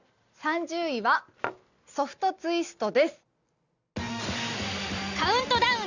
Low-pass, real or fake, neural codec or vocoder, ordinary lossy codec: 7.2 kHz; real; none; none